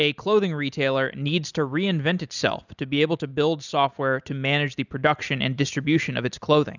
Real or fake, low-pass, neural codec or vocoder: real; 7.2 kHz; none